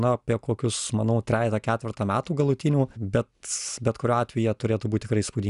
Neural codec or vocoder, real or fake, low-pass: none; real; 10.8 kHz